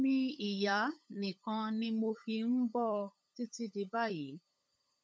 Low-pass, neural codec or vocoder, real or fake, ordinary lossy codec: none; codec, 16 kHz, 8 kbps, FunCodec, trained on LibriTTS, 25 frames a second; fake; none